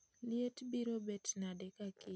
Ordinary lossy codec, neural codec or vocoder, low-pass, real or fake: none; none; none; real